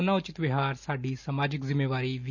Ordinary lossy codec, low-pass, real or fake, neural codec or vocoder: none; 7.2 kHz; real; none